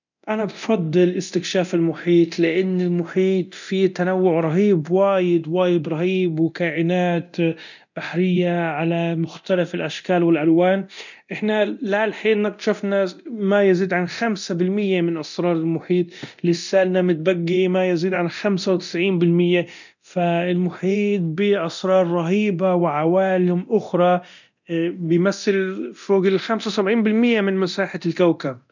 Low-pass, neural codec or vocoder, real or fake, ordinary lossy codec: 7.2 kHz; codec, 24 kHz, 0.9 kbps, DualCodec; fake; none